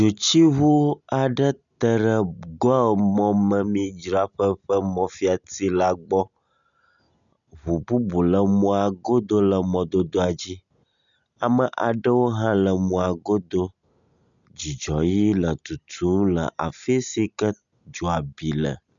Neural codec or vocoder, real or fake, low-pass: none; real; 7.2 kHz